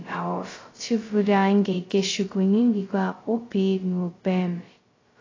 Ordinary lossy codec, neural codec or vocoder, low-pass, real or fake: AAC, 32 kbps; codec, 16 kHz, 0.2 kbps, FocalCodec; 7.2 kHz; fake